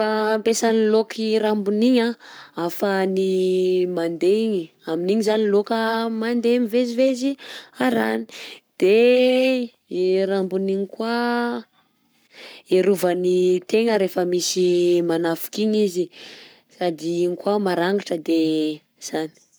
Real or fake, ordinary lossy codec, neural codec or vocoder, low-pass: fake; none; codec, 44.1 kHz, 7.8 kbps, Pupu-Codec; none